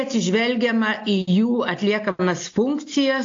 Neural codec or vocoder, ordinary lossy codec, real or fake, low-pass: none; AAC, 48 kbps; real; 7.2 kHz